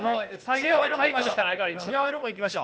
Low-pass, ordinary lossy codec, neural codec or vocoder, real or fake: none; none; codec, 16 kHz, 0.8 kbps, ZipCodec; fake